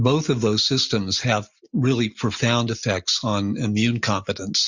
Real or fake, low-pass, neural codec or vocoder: real; 7.2 kHz; none